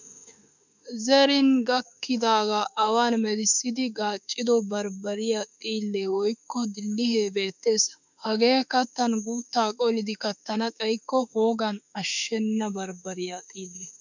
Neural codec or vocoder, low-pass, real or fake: autoencoder, 48 kHz, 32 numbers a frame, DAC-VAE, trained on Japanese speech; 7.2 kHz; fake